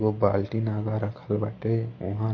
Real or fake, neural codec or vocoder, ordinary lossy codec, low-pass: real; none; MP3, 32 kbps; 7.2 kHz